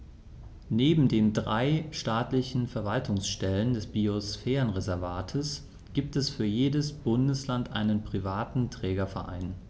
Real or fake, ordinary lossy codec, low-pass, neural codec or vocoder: real; none; none; none